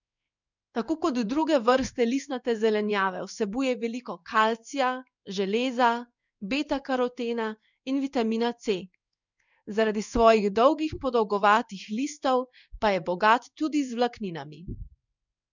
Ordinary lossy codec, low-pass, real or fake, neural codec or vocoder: none; 7.2 kHz; fake; codec, 16 kHz in and 24 kHz out, 1 kbps, XY-Tokenizer